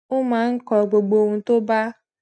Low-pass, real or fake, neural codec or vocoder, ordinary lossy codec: 9.9 kHz; real; none; none